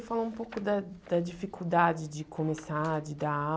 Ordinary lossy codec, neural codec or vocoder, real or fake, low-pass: none; none; real; none